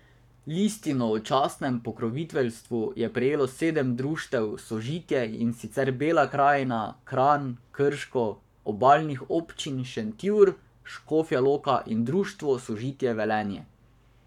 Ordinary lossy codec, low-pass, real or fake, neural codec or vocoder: none; 19.8 kHz; fake; codec, 44.1 kHz, 7.8 kbps, Pupu-Codec